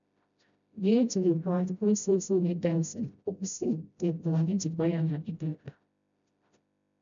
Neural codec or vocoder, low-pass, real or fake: codec, 16 kHz, 0.5 kbps, FreqCodec, smaller model; 7.2 kHz; fake